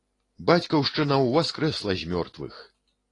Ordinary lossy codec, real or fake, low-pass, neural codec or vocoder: AAC, 32 kbps; real; 10.8 kHz; none